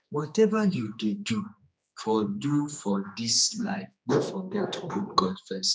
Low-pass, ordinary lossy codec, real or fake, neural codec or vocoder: none; none; fake; codec, 16 kHz, 2 kbps, X-Codec, HuBERT features, trained on general audio